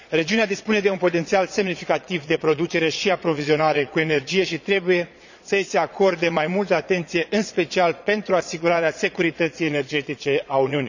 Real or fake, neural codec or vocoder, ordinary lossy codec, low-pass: fake; vocoder, 22.05 kHz, 80 mel bands, Vocos; none; 7.2 kHz